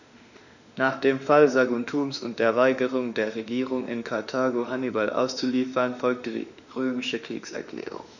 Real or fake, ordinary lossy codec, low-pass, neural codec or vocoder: fake; none; 7.2 kHz; autoencoder, 48 kHz, 32 numbers a frame, DAC-VAE, trained on Japanese speech